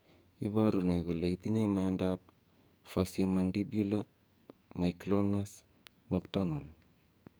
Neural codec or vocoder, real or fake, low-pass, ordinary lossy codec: codec, 44.1 kHz, 2.6 kbps, SNAC; fake; none; none